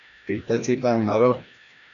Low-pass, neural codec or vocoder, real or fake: 7.2 kHz; codec, 16 kHz, 1 kbps, FreqCodec, larger model; fake